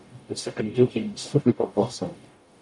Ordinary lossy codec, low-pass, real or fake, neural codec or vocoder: MP3, 96 kbps; 10.8 kHz; fake; codec, 44.1 kHz, 0.9 kbps, DAC